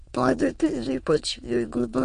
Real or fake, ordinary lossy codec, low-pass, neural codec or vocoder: fake; MP3, 48 kbps; 9.9 kHz; autoencoder, 22.05 kHz, a latent of 192 numbers a frame, VITS, trained on many speakers